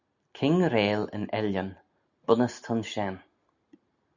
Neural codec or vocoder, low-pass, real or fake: none; 7.2 kHz; real